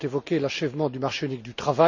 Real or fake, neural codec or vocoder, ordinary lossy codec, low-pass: real; none; none; 7.2 kHz